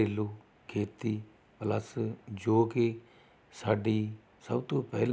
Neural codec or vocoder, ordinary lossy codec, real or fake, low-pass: none; none; real; none